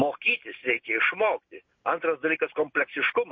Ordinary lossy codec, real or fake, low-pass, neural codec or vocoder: MP3, 32 kbps; real; 7.2 kHz; none